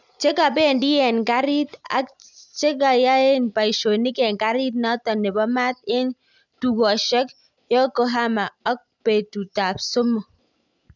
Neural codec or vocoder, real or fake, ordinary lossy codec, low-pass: none; real; none; 7.2 kHz